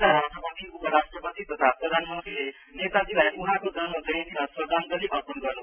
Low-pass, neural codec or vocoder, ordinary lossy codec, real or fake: 3.6 kHz; none; none; real